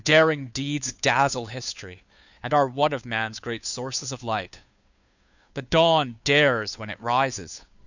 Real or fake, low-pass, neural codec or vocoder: fake; 7.2 kHz; codec, 16 kHz, 2 kbps, FunCodec, trained on Chinese and English, 25 frames a second